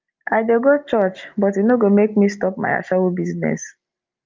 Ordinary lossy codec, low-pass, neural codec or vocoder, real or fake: Opus, 32 kbps; 7.2 kHz; none; real